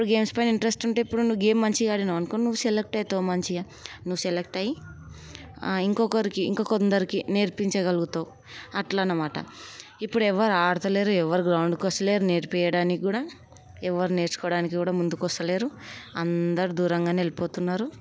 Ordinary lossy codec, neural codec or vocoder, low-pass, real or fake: none; none; none; real